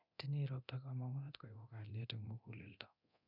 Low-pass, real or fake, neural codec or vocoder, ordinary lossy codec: 5.4 kHz; fake; codec, 24 kHz, 0.9 kbps, DualCodec; none